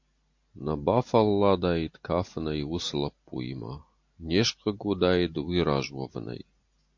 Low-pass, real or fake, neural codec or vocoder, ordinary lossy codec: 7.2 kHz; real; none; MP3, 48 kbps